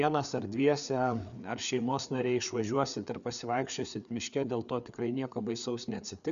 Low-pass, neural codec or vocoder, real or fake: 7.2 kHz; codec, 16 kHz, 4 kbps, FreqCodec, larger model; fake